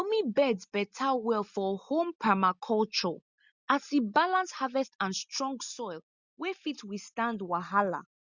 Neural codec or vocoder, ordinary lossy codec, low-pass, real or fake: none; Opus, 64 kbps; 7.2 kHz; real